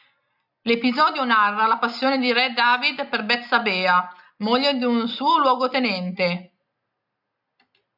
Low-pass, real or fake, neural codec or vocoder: 5.4 kHz; real; none